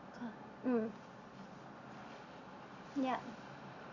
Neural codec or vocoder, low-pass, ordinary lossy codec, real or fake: none; 7.2 kHz; Opus, 64 kbps; real